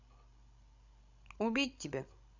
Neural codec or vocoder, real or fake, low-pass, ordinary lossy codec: codec, 16 kHz, 16 kbps, FreqCodec, larger model; fake; 7.2 kHz; none